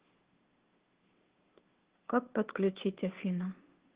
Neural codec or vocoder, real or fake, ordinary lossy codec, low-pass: codec, 16 kHz, 2 kbps, FunCodec, trained on Chinese and English, 25 frames a second; fake; Opus, 16 kbps; 3.6 kHz